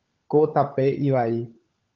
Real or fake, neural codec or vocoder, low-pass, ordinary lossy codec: fake; codec, 16 kHz, 16 kbps, FunCodec, trained on LibriTTS, 50 frames a second; 7.2 kHz; Opus, 24 kbps